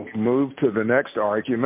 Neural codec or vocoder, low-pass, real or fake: none; 3.6 kHz; real